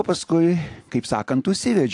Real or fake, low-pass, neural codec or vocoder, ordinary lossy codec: real; 10.8 kHz; none; AAC, 64 kbps